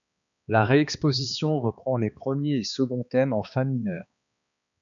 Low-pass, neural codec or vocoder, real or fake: 7.2 kHz; codec, 16 kHz, 2 kbps, X-Codec, HuBERT features, trained on balanced general audio; fake